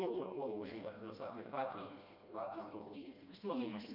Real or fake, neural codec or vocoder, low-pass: fake; codec, 16 kHz, 1 kbps, FreqCodec, smaller model; 5.4 kHz